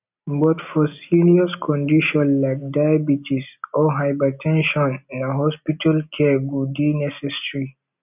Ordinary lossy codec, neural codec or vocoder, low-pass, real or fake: none; none; 3.6 kHz; real